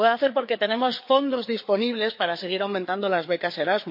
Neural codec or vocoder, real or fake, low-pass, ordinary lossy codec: codec, 16 kHz, 4 kbps, FreqCodec, larger model; fake; 5.4 kHz; MP3, 32 kbps